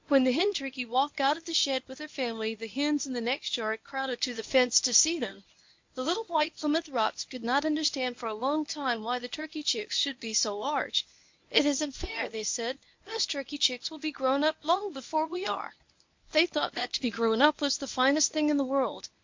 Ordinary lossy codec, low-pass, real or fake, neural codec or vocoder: MP3, 48 kbps; 7.2 kHz; fake; codec, 24 kHz, 0.9 kbps, WavTokenizer, medium speech release version 1